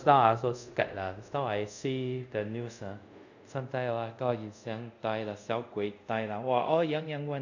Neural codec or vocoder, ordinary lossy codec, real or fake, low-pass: codec, 24 kHz, 0.5 kbps, DualCodec; none; fake; 7.2 kHz